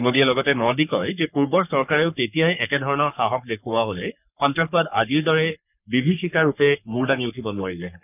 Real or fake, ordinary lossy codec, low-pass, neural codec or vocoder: fake; none; 3.6 kHz; codec, 44.1 kHz, 3.4 kbps, Pupu-Codec